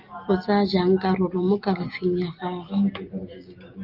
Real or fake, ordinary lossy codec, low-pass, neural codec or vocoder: real; Opus, 32 kbps; 5.4 kHz; none